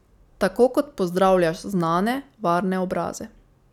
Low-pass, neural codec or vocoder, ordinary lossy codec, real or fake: 19.8 kHz; none; none; real